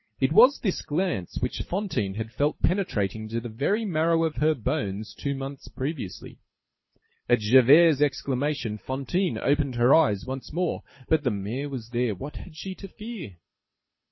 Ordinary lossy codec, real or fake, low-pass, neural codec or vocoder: MP3, 24 kbps; fake; 7.2 kHz; codec, 24 kHz, 6 kbps, HILCodec